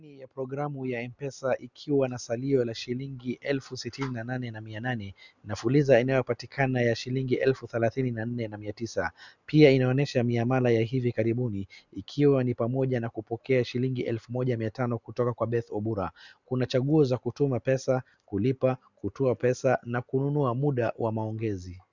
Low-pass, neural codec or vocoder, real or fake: 7.2 kHz; none; real